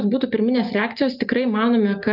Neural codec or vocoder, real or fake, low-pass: none; real; 5.4 kHz